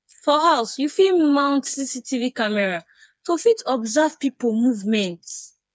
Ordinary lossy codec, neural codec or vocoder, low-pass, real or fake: none; codec, 16 kHz, 4 kbps, FreqCodec, smaller model; none; fake